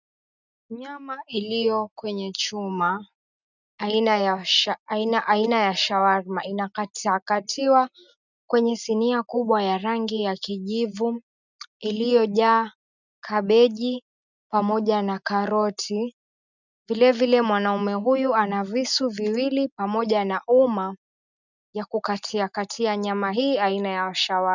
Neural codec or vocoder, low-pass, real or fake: none; 7.2 kHz; real